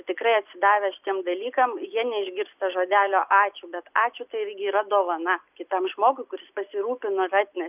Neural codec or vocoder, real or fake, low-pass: none; real; 3.6 kHz